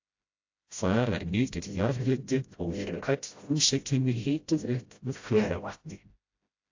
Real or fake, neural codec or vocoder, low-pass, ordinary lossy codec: fake; codec, 16 kHz, 0.5 kbps, FreqCodec, smaller model; 7.2 kHz; AAC, 48 kbps